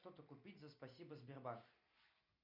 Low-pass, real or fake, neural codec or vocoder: 5.4 kHz; real; none